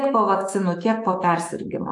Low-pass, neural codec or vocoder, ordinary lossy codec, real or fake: 10.8 kHz; autoencoder, 48 kHz, 128 numbers a frame, DAC-VAE, trained on Japanese speech; AAC, 64 kbps; fake